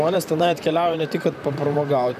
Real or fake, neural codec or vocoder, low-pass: fake; vocoder, 44.1 kHz, 128 mel bands, Pupu-Vocoder; 14.4 kHz